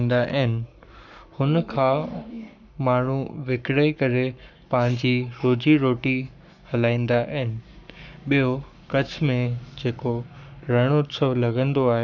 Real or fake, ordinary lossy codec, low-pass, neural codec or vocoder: fake; none; 7.2 kHz; codec, 44.1 kHz, 7.8 kbps, Pupu-Codec